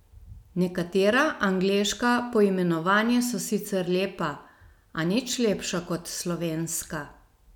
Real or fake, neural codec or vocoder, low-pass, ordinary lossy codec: real; none; 19.8 kHz; none